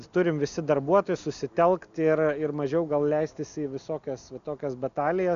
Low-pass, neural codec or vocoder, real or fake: 7.2 kHz; none; real